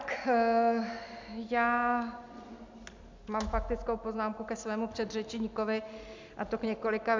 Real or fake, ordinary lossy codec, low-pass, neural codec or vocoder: real; MP3, 64 kbps; 7.2 kHz; none